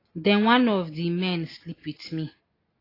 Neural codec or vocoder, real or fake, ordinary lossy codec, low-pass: none; real; AAC, 24 kbps; 5.4 kHz